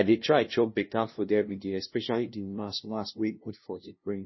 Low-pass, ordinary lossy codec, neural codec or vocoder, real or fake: 7.2 kHz; MP3, 24 kbps; codec, 16 kHz, 0.5 kbps, FunCodec, trained on LibriTTS, 25 frames a second; fake